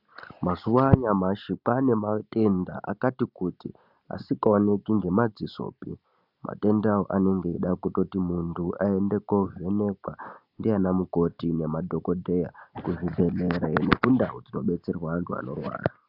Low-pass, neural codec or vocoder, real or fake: 5.4 kHz; none; real